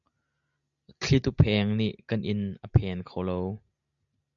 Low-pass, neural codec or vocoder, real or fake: 7.2 kHz; none; real